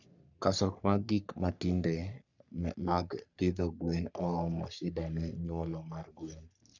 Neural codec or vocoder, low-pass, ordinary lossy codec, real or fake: codec, 44.1 kHz, 3.4 kbps, Pupu-Codec; 7.2 kHz; none; fake